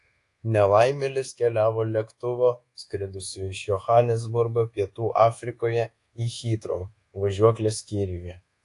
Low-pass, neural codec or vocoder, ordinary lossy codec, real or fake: 10.8 kHz; codec, 24 kHz, 1.2 kbps, DualCodec; AAC, 48 kbps; fake